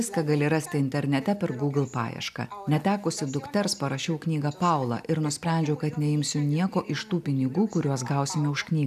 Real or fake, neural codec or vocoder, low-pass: real; none; 14.4 kHz